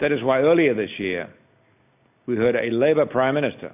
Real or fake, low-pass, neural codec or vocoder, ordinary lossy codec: real; 3.6 kHz; none; AAC, 32 kbps